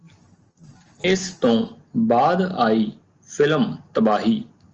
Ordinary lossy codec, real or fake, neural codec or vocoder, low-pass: Opus, 24 kbps; real; none; 7.2 kHz